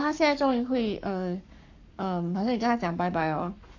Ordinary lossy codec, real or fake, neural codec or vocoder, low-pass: AAC, 48 kbps; fake; codec, 44.1 kHz, 7.8 kbps, Pupu-Codec; 7.2 kHz